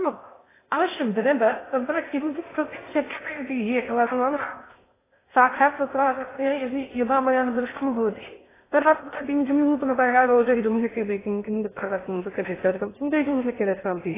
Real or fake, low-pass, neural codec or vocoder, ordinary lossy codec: fake; 3.6 kHz; codec, 16 kHz in and 24 kHz out, 0.6 kbps, FocalCodec, streaming, 2048 codes; AAC, 16 kbps